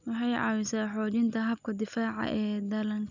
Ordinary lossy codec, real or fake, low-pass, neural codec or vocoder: none; real; 7.2 kHz; none